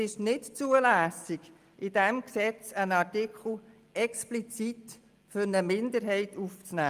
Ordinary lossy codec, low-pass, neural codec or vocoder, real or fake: Opus, 32 kbps; 14.4 kHz; none; real